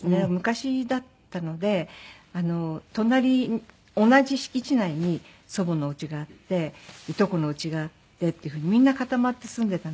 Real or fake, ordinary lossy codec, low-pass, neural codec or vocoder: real; none; none; none